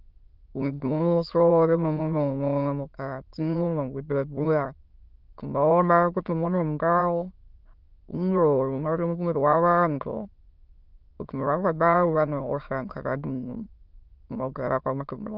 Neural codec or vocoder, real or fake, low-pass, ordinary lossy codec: autoencoder, 22.05 kHz, a latent of 192 numbers a frame, VITS, trained on many speakers; fake; 5.4 kHz; Opus, 24 kbps